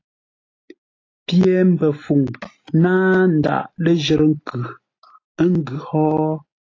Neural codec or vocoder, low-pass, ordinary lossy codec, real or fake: none; 7.2 kHz; AAC, 32 kbps; real